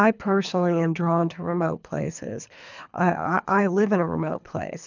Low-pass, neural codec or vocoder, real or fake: 7.2 kHz; codec, 24 kHz, 3 kbps, HILCodec; fake